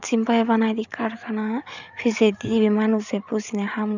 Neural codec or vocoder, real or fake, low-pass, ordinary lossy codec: codec, 16 kHz, 8 kbps, FreqCodec, larger model; fake; 7.2 kHz; none